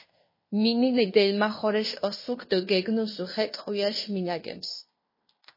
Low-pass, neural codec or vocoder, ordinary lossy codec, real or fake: 5.4 kHz; codec, 16 kHz in and 24 kHz out, 0.9 kbps, LongCat-Audio-Codec, fine tuned four codebook decoder; MP3, 24 kbps; fake